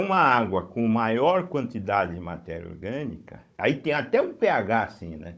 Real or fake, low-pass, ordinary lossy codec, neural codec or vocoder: fake; none; none; codec, 16 kHz, 16 kbps, FunCodec, trained on Chinese and English, 50 frames a second